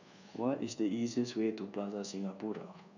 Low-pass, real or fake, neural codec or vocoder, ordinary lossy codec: 7.2 kHz; fake; codec, 24 kHz, 1.2 kbps, DualCodec; none